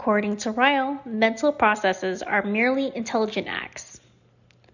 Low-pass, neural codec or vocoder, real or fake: 7.2 kHz; none; real